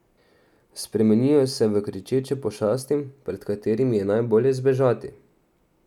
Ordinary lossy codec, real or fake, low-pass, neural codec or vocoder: none; real; 19.8 kHz; none